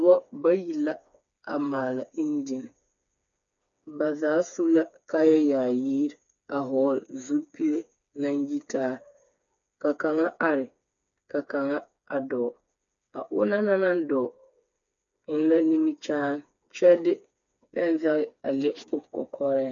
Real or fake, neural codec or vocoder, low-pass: fake; codec, 16 kHz, 4 kbps, FreqCodec, smaller model; 7.2 kHz